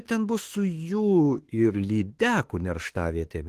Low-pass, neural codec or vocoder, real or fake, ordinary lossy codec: 14.4 kHz; autoencoder, 48 kHz, 32 numbers a frame, DAC-VAE, trained on Japanese speech; fake; Opus, 16 kbps